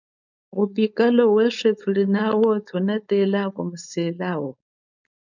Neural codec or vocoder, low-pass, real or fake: codec, 16 kHz, 4.8 kbps, FACodec; 7.2 kHz; fake